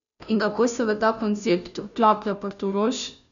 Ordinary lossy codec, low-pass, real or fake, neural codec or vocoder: none; 7.2 kHz; fake; codec, 16 kHz, 0.5 kbps, FunCodec, trained on Chinese and English, 25 frames a second